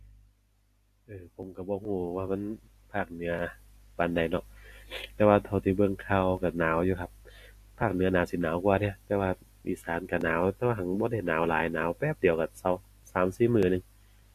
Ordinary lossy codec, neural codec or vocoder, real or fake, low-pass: AAC, 64 kbps; none; real; 14.4 kHz